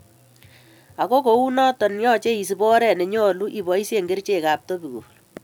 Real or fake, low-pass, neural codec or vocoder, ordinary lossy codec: fake; 19.8 kHz; vocoder, 44.1 kHz, 128 mel bands every 256 samples, BigVGAN v2; none